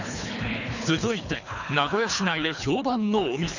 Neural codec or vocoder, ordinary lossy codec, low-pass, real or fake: codec, 24 kHz, 3 kbps, HILCodec; none; 7.2 kHz; fake